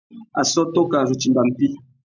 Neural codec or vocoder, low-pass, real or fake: none; 7.2 kHz; real